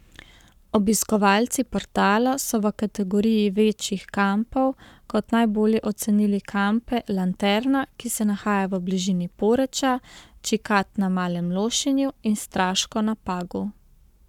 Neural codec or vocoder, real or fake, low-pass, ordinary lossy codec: codec, 44.1 kHz, 7.8 kbps, Pupu-Codec; fake; 19.8 kHz; none